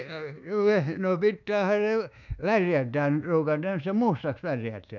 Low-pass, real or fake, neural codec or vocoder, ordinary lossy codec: 7.2 kHz; fake; codec, 24 kHz, 1.2 kbps, DualCodec; none